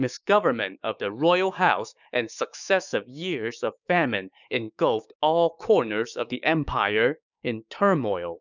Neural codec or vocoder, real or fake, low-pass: codec, 16 kHz, 6 kbps, DAC; fake; 7.2 kHz